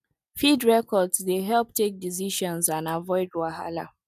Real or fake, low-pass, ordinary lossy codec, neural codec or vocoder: real; 14.4 kHz; none; none